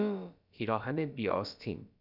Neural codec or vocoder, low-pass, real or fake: codec, 16 kHz, about 1 kbps, DyCAST, with the encoder's durations; 5.4 kHz; fake